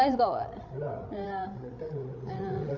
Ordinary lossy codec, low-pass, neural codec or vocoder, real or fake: none; 7.2 kHz; codec, 16 kHz, 16 kbps, FreqCodec, larger model; fake